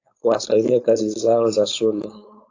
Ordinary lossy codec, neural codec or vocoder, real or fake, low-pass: AAC, 48 kbps; codec, 16 kHz, 4.8 kbps, FACodec; fake; 7.2 kHz